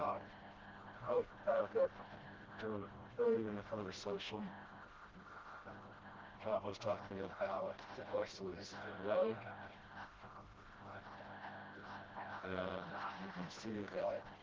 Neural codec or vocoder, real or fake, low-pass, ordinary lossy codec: codec, 16 kHz, 0.5 kbps, FreqCodec, smaller model; fake; 7.2 kHz; Opus, 16 kbps